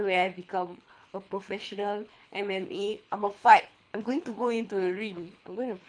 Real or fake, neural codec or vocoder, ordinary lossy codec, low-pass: fake; codec, 24 kHz, 3 kbps, HILCodec; MP3, 64 kbps; 9.9 kHz